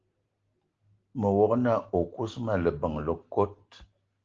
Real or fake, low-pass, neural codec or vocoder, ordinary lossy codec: real; 7.2 kHz; none; Opus, 16 kbps